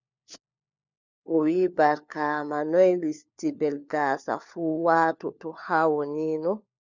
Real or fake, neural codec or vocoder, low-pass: fake; codec, 16 kHz, 4 kbps, FunCodec, trained on LibriTTS, 50 frames a second; 7.2 kHz